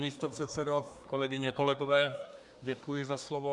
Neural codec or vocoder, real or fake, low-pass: codec, 24 kHz, 1 kbps, SNAC; fake; 10.8 kHz